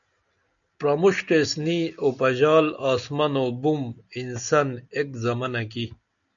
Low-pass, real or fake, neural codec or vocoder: 7.2 kHz; real; none